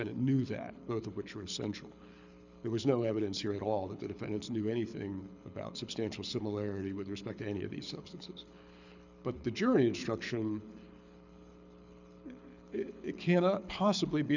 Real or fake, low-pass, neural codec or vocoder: fake; 7.2 kHz; codec, 24 kHz, 6 kbps, HILCodec